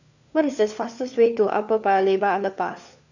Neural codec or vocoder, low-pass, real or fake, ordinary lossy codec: codec, 16 kHz, 4 kbps, FunCodec, trained on LibriTTS, 50 frames a second; 7.2 kHz; fake; none